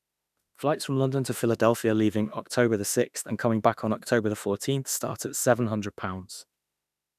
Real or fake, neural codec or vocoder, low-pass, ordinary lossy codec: fake; autoencoder, 48 kHz, 32 numbers a frame, DAC-VAE, trained on Japanese speech; 14.4 kHz; none